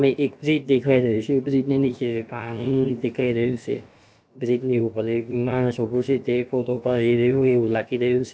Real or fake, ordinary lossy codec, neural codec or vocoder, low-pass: fake; none; codec, 16 kHz, about 1 kbps, DyCAST, with the encoder's durations; none